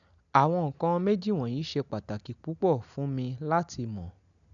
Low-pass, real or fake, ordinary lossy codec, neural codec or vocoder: 7.2 kHz; real; none; none